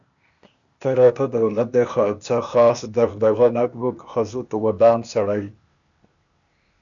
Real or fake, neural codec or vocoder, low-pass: fake; codec, 16 kHz, 0.8 kbps, ZipCodec; 7.2 kHz